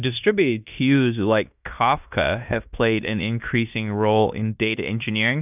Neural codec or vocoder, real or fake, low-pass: codec, 16 kHz in and 24 kHz out, 0.9 kbps, LongCat-Audio-Codec, fine tuned four codebook decoder; fake; 3.6 kHz